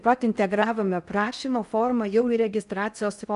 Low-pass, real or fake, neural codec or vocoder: 10.8 kHz; fake; codec, 16 kHz in and 24 kHz out, 0.6 kbps, FocalCodec, streaming, 4096 codes